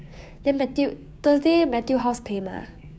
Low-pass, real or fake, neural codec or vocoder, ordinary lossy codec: none; fake; codec, 16 kHz, 6 kbps, DAC; none